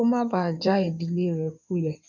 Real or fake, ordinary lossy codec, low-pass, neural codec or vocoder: fake; none; 7.2 kHz; codec, 16 kHz in and 24 kHz out, 2.2 kbps, FireRedTTS-2 codec